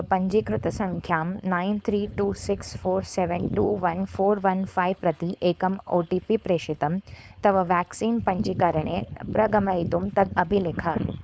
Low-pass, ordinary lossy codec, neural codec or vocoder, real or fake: none; none; codec, 16 kHz, 4.8 kbps, FACodec; fake